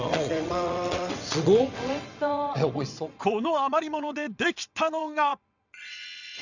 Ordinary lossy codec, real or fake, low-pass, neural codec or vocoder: none; fake; 7.2 kHz; vocoder, 22.05 kHz, 80 mel bands, WaveNeXt